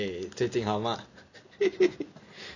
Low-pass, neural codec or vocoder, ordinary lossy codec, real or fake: 7.2 kHz; none; none; real